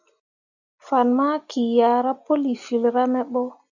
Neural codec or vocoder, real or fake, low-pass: none; real; 7.2 kHz